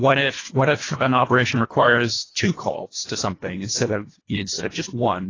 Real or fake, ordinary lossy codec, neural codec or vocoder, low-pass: fake; AAC, 32 kbps; codec, 24 kHz, 1.5 kbps, HILCodec; 7.2 kHz